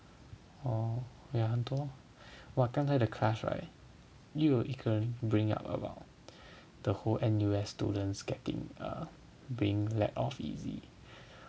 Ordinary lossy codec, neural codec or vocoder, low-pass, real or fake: none; none; none; real